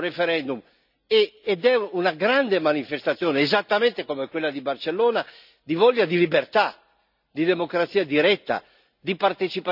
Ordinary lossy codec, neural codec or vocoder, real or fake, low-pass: none; none; real; 5.4 kHz